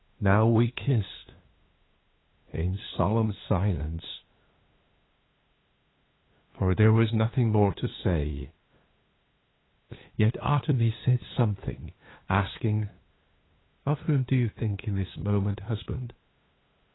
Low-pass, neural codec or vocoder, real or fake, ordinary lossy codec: 7.2 kHz; codec, 16 kHz, 2 kbps, FunCodec, trained on LibriTTS, 25 frames a second; fake; AAC, 16 kbps